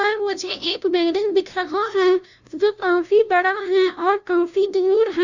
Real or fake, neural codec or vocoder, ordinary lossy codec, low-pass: fake; codec, 16 kHz, 0.5 kbps, FunCodec, trained on LibriTTS, 25 frames a second; none; 7.2 kHz